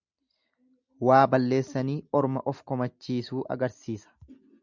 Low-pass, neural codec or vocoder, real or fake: 7.2 kHz; none; real